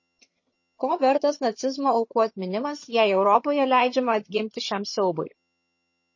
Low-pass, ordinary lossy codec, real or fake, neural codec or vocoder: 7.2 kHz; MP3, 32 kbps; fake; vocoder, 22.05 kHz, 80 mel bands, HiFi-GAN